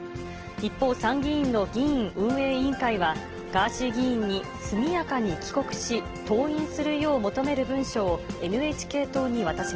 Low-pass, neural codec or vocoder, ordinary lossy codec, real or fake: 7.2 kHz; none; Opus, 16 kbps; real